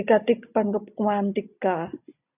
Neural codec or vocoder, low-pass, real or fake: none; 3.6 kHz; real